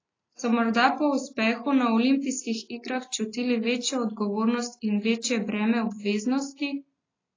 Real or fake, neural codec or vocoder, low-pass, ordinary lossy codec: real; none; 7.2 kHz; AAC, 32 kbps